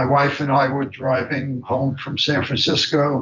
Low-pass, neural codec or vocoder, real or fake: 7.2 kHz; vocoder, 24 kHz, 100 mel bands, Vocos; fake